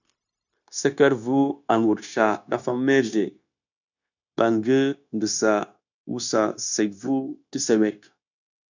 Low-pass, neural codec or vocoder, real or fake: 7.2 kHz; codec, 16 kHz, 0.9 kbps, LongCat-Audio-Codec; fake